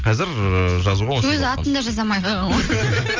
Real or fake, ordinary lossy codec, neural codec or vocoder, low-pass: real; Opus, 32 kbps; none; 7.2 kHz